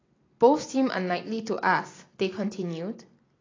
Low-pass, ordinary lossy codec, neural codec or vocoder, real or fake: 7.2 kHz; AAC, 32 kbps; none; real